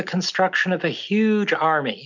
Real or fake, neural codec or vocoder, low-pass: real; none; 7.2 kHz